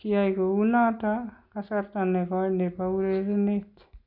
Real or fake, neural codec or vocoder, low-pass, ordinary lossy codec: real; none; 5.4 kHz; none